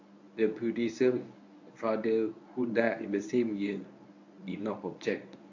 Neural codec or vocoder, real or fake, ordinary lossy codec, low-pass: codec, 24 kHz, 0.9 kbps, WavTokenizer, medium speech release version 1; fake; AAC, 48 kbps; 7.2 kHz